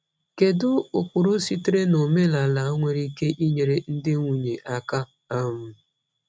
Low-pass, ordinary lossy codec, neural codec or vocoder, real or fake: none; none; none; real